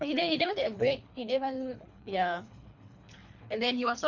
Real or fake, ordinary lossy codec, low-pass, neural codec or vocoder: fake; none; 7.2 kHz; codec, 24 kHz, 3 kbps, HILCodec